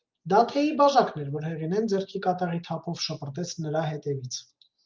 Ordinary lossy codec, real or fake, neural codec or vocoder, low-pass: Opus, 24 kbps; real; none; 7.2 kHz